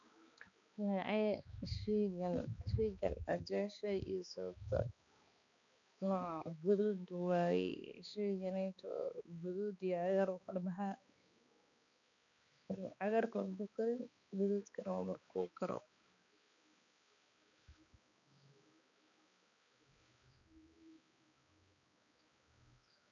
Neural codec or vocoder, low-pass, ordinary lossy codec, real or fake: codec, 16 kHz, 2 kbps, X-Codec, HuBERT features, trained on balanced general audio; 7.2 kHz; none; fake